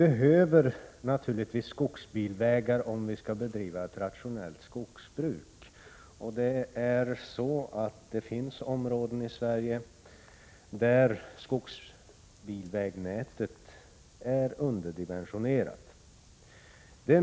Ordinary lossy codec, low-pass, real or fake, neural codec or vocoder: none; none; real; none